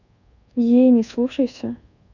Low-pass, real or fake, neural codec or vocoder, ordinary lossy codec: 7.2 kHz; fake; codec, 24 kHz, 1.2 kbps, DualCodec; none